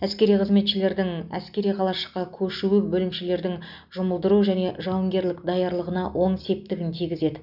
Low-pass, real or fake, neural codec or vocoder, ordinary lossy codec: 5.4 kHz; real; none; none